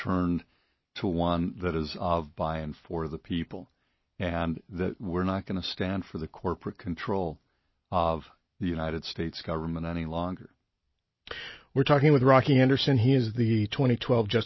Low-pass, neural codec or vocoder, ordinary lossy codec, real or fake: 7.2 kHz; none; MP3, 24 kbps; real